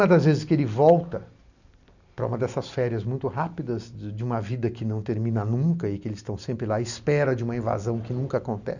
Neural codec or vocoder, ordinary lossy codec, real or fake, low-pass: none; none; real; 7.2 kHz